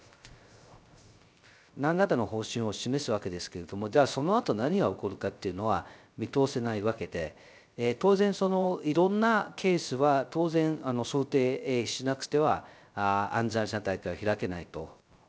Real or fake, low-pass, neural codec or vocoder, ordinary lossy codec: fake; none; codec, 16 kHz, 0.3 kbps, FocalCodec; none